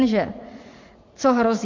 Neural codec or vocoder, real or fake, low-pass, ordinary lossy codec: vocoder, 44.1 kHz, 80 mel bands, Vocos; fake; 7.2 kHz; MP3, 48 kbps